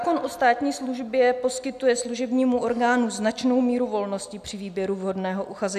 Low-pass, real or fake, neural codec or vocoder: 14.4 kHz; real; none